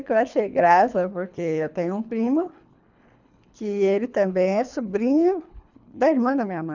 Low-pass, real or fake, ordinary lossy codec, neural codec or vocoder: 7.2 kHz; fake; none; codec, 24 kHz, 3 kbps, HILCodec